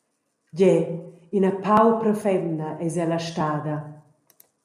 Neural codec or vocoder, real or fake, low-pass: none; real; 14.4 kHz